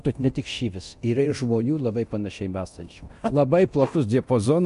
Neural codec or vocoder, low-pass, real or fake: codec, 24 kHz, 0.9 kbps, DualCodec; 10.8 kHz; fake